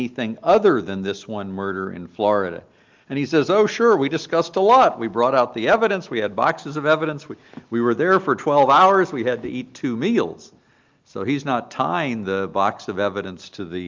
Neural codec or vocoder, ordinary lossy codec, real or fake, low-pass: none; Opus, 32 kbps; real; 7.2 kHz